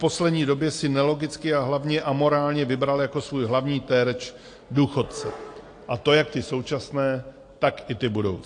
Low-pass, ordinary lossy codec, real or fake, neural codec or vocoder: 9.9 kHz; AAC, 48 kbps; real; none